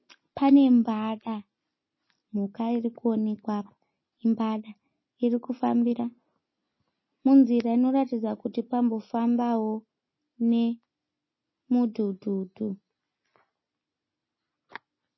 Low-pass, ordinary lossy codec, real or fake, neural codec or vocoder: 7.2 kHz; MP3, 24 kbps; real; none